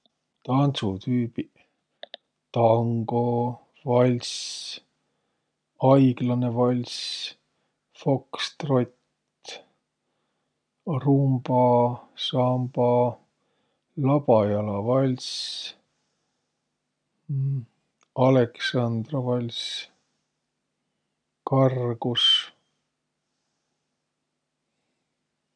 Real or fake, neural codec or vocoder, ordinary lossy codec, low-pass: real; none; none; 9.9 kHz